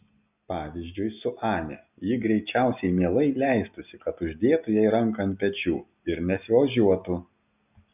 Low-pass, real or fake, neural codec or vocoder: 3.6 kHz; real; none